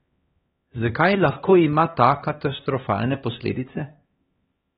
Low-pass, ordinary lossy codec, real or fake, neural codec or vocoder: 7.2 kHz; AAC, 16 kbps; fake; codec, 16 kHz, 2 kbps, X-Codec, HuBERT features, trained on LibriSpeech